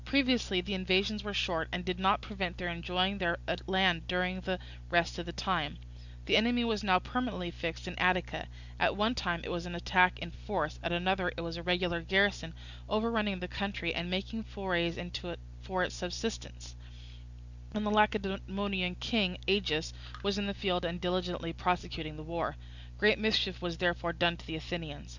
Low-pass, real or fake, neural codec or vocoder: 7.2 kHz; real; none